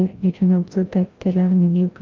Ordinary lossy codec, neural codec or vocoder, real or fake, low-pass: Opus, 32 kbps; codec, 16 kHz, 0.5 kbps, FreqCodec, larger model; fake; 7.2 kHz